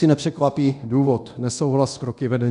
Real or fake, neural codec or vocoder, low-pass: fake; codec, 24 kHz, 0.9 kbps, DualCodec; 10.8 kHz